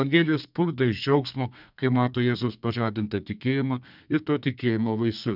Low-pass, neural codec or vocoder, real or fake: 5.4 kHz; codec, 32 kHz, 1.9 kbps, SNAC; fake